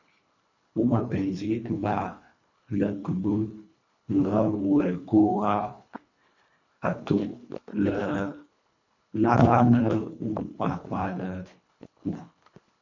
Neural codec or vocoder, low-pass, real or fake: codec, 24 kHz, 1.5 kbps, HILCodec; 7.2 kHz; fake